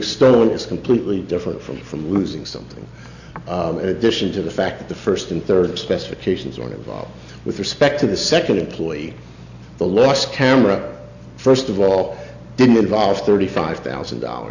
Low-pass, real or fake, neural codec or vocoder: 7.2 kHz; real; none